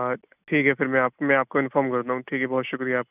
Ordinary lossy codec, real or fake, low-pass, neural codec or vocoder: none; real; 3.6 kHz; none